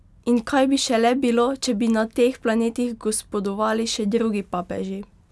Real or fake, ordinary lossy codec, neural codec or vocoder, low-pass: real; none; none; none